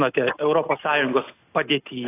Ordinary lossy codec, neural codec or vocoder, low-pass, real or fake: AAC, 16 kbps; none; 3.6 kHz; real